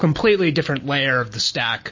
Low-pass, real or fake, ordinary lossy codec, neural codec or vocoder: 7.2 kHz; real; MP3, 32 kbps; none